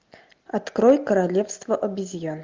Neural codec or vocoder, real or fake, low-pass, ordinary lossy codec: none; real; 7.2 kHz; Opus, 32 kbps